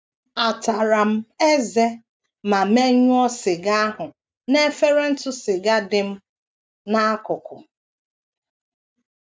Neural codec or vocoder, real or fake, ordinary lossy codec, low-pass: none; real; none; none